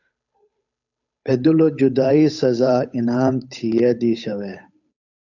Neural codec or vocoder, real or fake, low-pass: codec, 16 kHz, 8 kbps, FunCodec, trained on Chinese and English, 25 frames a second; fake; 7.2 kHz